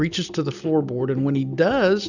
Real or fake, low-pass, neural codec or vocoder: real; 7.2 kHz; none